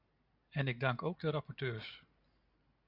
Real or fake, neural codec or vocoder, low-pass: real; none; 5.4 kHz